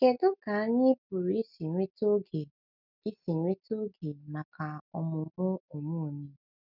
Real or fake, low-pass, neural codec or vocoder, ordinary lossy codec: real; 5.4 kHz; none; none